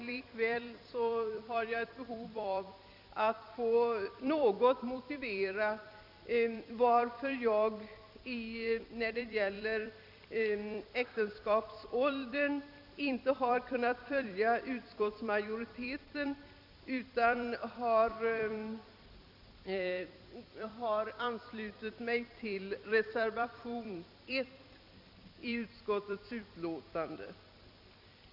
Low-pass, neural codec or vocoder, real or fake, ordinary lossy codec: 5.4 kHz; vocoder, 44.1 kHz, 128 mel bands every 256 samples, BigVGAN v2; fake; none